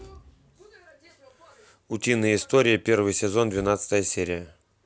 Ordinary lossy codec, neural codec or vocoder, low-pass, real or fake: none; none; none; real